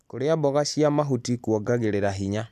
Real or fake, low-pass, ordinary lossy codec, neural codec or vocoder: real; 14.4 kHz; none; none